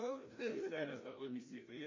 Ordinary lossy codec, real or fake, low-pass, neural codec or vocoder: MP3, 32 kbps; fake; 7.2 kHz; codec, 16 kHz, 1 kbps, FreqCodec, larger model